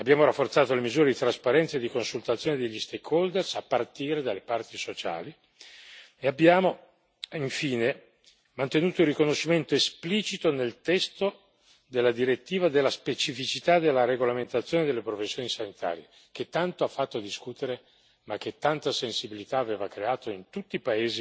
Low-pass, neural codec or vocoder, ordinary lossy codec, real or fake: none; none; none; real